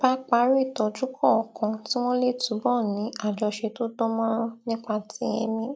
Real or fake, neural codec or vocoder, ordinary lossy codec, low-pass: real; none; none; none